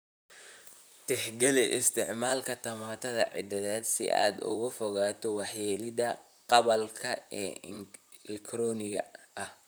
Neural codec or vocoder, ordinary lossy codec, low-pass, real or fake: vocoder, 44.1 kHz, 128 mel bands, Pupu-Vocoder; none; none; fake